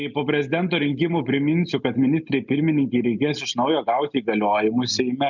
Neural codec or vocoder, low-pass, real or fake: none; 7.2 kHz; real